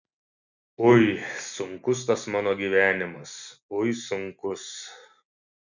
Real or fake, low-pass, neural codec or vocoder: real; 7.2 kHz; none